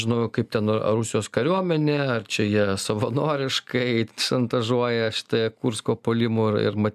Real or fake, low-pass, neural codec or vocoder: real; 14.4 kHz; none